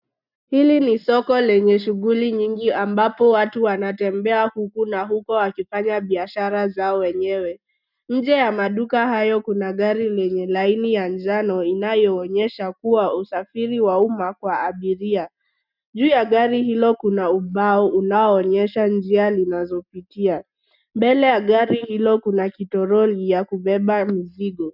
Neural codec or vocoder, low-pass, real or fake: none; 5.4 kHz; real